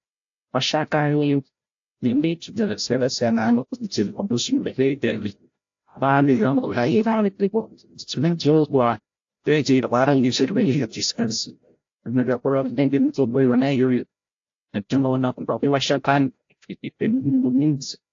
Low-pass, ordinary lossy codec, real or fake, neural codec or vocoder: 7.2 kHz; AAC, 48 kbps; fake; codec, 16 kHz, 0.5 kbps, FreqCodec, larger model